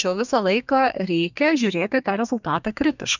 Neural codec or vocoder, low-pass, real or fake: codec, 32 kHz, 1.9 kbps, SNAC; 7.2 kHz; fake